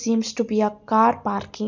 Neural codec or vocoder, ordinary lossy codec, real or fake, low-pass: none; none; real; 7.2 kHz